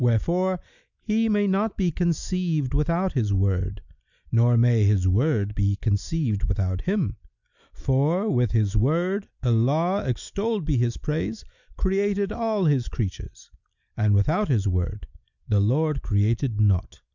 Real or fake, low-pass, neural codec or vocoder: real; 7.2 kHz; none